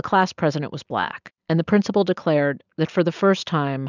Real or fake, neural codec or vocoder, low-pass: real; none; 7.2 kHz